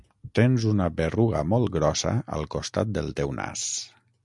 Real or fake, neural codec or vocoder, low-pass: real; none; 10.8 kHz